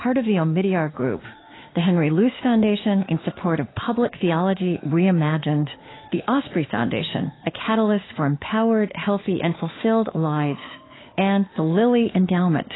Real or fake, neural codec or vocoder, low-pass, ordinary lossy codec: fake; autoencoder, 48 kHz, 32 numbers a frame, DAC-VAE, trained on Japanese speech; 7.2 kHz; AAC, 16 kbps